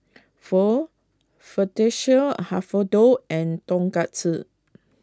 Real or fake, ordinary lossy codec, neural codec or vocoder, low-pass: real; none; none; none